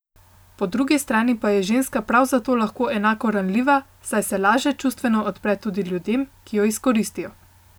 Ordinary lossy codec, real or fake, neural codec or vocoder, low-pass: none; real; none; none